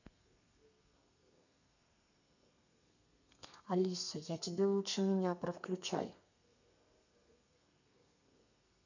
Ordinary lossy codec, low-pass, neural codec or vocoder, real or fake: none; 7.2 kHz; codec, 32 kHz, 1.9 kbps, SNAC; fake